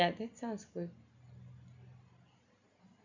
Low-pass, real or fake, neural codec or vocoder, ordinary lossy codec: 7.2 kHz; real; none; none